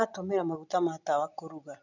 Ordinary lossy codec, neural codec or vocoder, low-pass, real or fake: none; none; 7.2 kHz; real